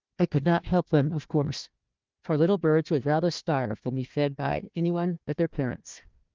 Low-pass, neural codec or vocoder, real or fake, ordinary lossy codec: 7.2 kHz; codec, 16 kHz, 1 kbps, FunCodec, trained on Chinese and English, 50 frames a second; fake; Opus, 32 kbps